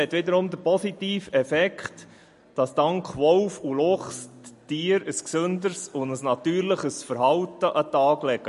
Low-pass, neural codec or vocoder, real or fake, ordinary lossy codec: 14.4 kHz; none; real; MP3, 48 kbps